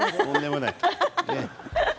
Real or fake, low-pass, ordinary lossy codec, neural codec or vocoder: real; none; none; none